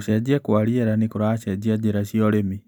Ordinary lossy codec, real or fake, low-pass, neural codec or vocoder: none; real; none; none